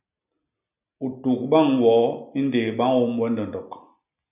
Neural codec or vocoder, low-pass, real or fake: none; 3.6 kHz; real